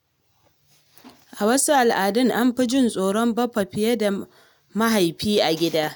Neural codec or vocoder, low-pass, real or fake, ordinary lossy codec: none; none; real; none